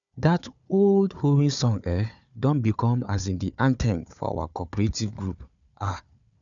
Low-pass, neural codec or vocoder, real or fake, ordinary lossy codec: 7.2 kHz; codec, 16 kHz, 4 kbps, FunCodec, trained on Chinese and English, 50 frames a second; fake; none